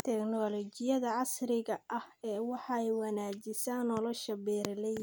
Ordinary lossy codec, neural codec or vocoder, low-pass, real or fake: none; none; none; real